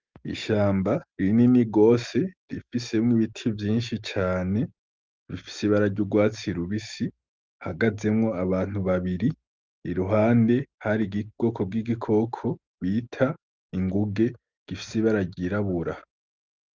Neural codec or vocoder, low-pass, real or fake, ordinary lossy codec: none; 7.2 kHz; real; Opus, 32 kbps